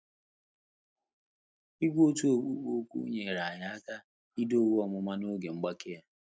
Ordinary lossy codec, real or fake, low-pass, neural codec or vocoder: none; real; none; none